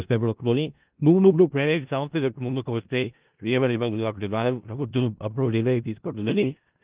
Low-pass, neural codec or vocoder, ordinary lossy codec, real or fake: 3.6 kHz; codec, 16 kHz in and 24 kHz out, 0.4 kbps, LongCat-Audio-Codec, four codebook decoder; Opus, 32 kbps; fake